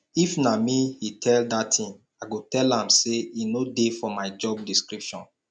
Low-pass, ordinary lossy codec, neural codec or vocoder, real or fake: 9.9 kHz; none; none; real